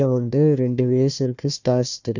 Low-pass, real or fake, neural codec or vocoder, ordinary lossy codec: 7.2 kHz; fake; codec, 16 kHz, 1 kbps, FunCodec, trained on Chinese and English, 50 frames a second; none